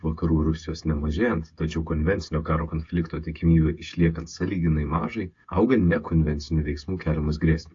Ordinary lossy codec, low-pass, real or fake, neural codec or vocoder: AAC, 48 kbps; 7.2 kHz; fake; codec, 16 kHz, 8 kbps, FreqCodec, smaller model